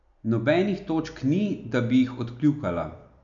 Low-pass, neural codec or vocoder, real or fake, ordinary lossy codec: 7.2 kHz; none; real; none